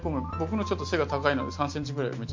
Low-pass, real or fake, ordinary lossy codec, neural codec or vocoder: 7.2 kHz; real; MP3, 48 kbps; none